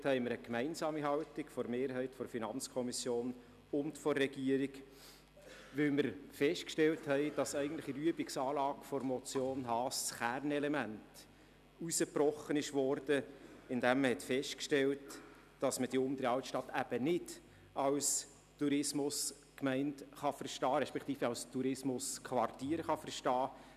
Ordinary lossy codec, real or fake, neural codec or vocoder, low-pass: none; real; none; 14.4 kHz